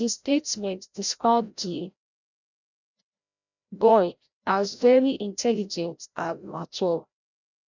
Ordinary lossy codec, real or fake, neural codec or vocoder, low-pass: none; fake; codec, 16 kHz, 0.5 kbps, FreqCodec, larger model; 7.2 kHz